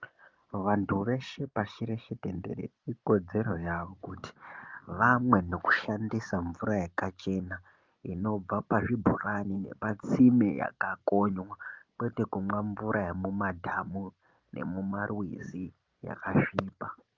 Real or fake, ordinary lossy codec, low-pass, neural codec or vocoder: real; Opus, 24 kbps; 7.2 kHz; none